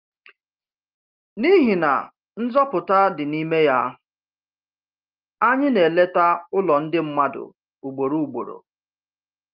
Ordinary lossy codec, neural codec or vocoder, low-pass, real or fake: Opus, 32 kbps; none; 5.4 kHz; real